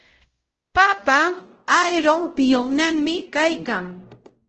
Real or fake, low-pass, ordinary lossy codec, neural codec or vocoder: fake; 7.2 kHz; Opus, 16 kbps; codec, 16 kHz, 0.5 kbps, X-Codec, WavLM features, trained on Multilingual LibriSpeech